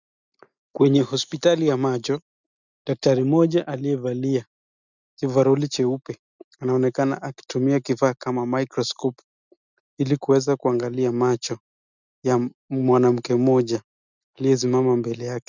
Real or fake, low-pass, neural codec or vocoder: real; 7.2 kHz; none